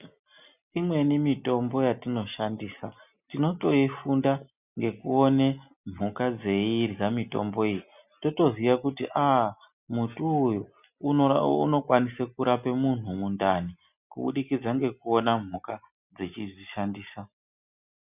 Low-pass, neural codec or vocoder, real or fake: 3.6 kHz; none; real